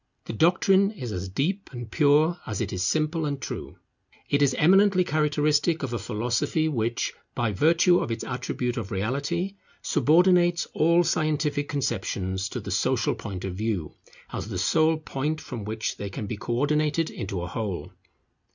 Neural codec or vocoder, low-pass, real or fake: none; 7.2 kHz; real